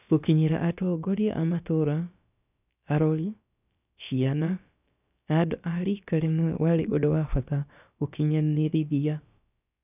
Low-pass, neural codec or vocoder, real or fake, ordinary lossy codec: 3.6 kHz; codec, 16 kHz, about 1 kbps, DyCAST, with the encoder's durations; fake; none